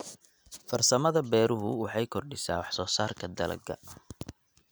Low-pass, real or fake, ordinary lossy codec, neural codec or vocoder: none; real; none; none